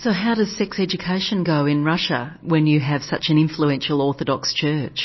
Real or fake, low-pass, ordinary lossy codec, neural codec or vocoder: real; 7.2 kHz; MP3, 24 kbps; none